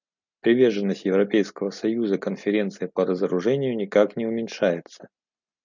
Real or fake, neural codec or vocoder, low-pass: real; none; 7.2 kHz